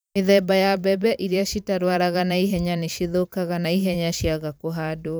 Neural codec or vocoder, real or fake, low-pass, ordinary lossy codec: vocoder, 44.1 kHz, 128 mel bands every 512 samples, BigVGAN v2; fake; none; none